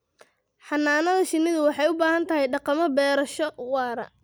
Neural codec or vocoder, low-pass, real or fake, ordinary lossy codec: none; none; real; none